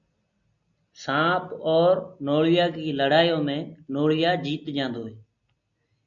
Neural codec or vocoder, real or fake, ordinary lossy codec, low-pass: none; real; MP3, 64 kbps; 7.2 kHz